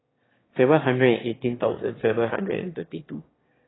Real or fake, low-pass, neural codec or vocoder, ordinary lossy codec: fake; 7.2 kHz; autoencoder, 22.05 kHz, a latent of 192 numbers a frame, VITS, trained on one speaker; AAC, 16 kbps